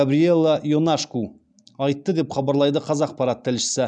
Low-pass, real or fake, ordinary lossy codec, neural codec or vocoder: 9.9 kHz; real; none; none